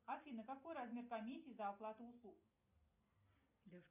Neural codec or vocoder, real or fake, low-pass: none; real; 3.6 kHz